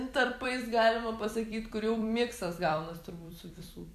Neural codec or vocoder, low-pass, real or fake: vocoder, 44.1 kHz, 128 mel bands every 256 samples, BigVGAN v2; 14.4 kHz; fake